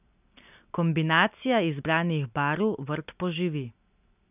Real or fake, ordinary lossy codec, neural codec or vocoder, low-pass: real; none; none; 3.6 kHz